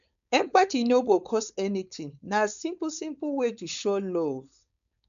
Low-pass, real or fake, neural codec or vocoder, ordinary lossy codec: 7.2 kHz; fake; codec, 16 kHz, 4.8 kbps, FACodec; none